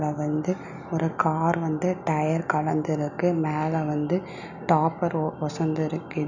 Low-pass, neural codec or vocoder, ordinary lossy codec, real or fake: 7.2 kHz; none; none; real